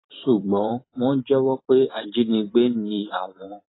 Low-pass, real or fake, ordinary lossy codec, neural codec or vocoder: 7.2 kHz; fake; AAC, 16 kbps; vocoder, 44.1 kHz, 128 mel bands every 512 samples, BigVGAN v2